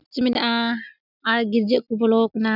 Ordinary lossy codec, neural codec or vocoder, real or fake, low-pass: none; none; real; 5.4 kHz